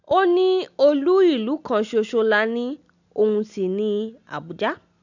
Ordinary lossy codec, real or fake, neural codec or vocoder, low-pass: none; real; none; 7.2 kHz